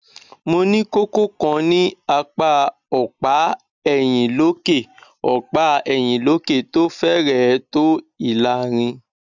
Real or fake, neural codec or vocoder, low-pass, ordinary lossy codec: real; none; 7.2 kHz; none